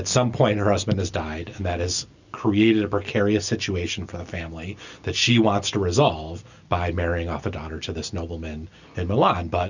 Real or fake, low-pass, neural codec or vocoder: real; 7.2 kHz; none